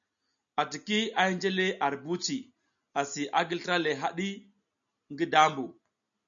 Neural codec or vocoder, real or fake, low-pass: none; real; 7.2 kHz